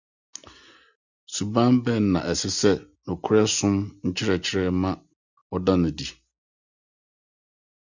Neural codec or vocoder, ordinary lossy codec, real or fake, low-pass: none; Opus, 64 kbps; real; 7.2 kHz